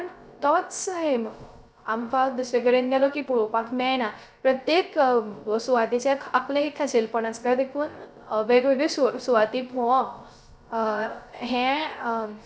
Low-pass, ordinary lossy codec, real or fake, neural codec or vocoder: none; none; fake; codec, 16 kHz, 0.3 kbps, FocalCodec